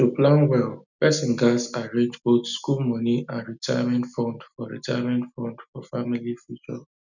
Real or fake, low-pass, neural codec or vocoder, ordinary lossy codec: real; 7.2 kHz; none; none